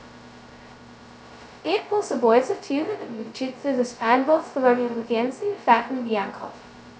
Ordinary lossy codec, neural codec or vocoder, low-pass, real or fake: none; codec, 16 kHz, 0.2 kbps, FocalCodec; none; fake